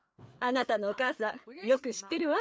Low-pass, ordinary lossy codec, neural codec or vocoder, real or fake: none; none; codec, 16 kHz, 4 kbps, FreqCodec, larger model; fake